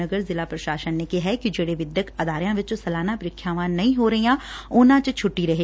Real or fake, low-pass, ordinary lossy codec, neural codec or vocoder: real; none; none; none